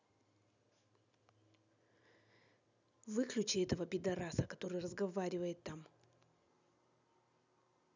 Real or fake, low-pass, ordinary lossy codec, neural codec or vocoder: real; 7.2 kHz; none; none